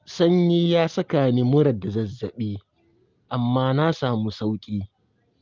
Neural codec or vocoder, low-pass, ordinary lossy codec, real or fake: none; 7.2 kHz; Opus, 24 kbps; real